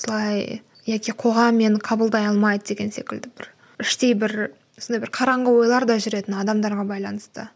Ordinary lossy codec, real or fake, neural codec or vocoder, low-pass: none; real; none; none